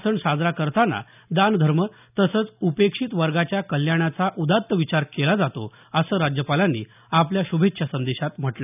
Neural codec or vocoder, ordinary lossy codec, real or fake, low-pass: none; none; real; 3.6 kHz